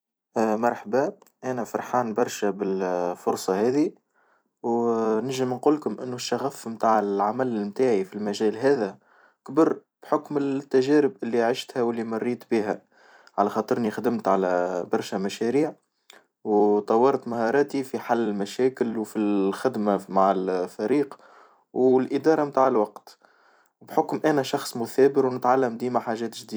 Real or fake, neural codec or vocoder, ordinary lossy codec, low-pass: fake; vocoder, 44.1 kHz, 128 mel bands every 256 samples, BigVGAN v2; none; none